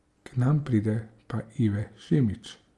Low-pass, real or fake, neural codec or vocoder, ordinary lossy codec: 10.8 kHz; real; none; Opus, 32 kbps